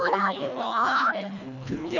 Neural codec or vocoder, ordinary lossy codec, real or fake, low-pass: codec, 24 kHz, 1.5 kbps, HILCodec; none; fake; 7.2 kHz